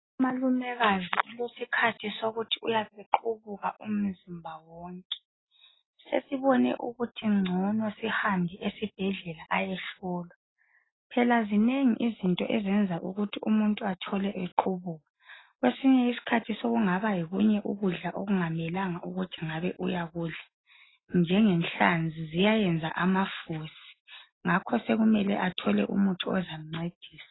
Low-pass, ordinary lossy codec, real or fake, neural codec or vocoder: 7.2 kHz; AAC, 16 kbps; real; none